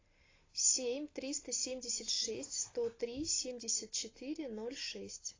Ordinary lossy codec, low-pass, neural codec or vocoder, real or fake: AAC, 32 kbps; 7.2 kHz; none; real